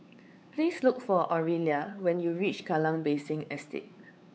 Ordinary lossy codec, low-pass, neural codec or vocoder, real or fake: none; none; codec, 16 kHz, 4 kbps, X-Codec, WavLM features, trained on Multilingual LibriSpeech; fake